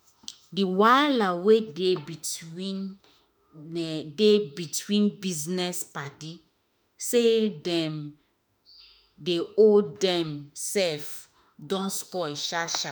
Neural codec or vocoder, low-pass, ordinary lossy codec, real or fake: autoencoder, 48 kHz, 32 numbers a frame, DAC-VAE, trained on Japanese speech; none; none; fake